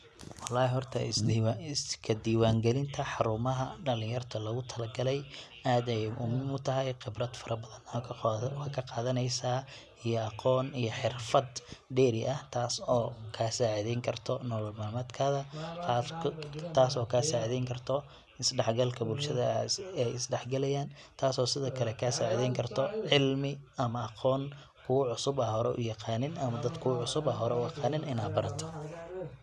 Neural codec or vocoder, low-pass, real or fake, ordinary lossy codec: none; none; real; none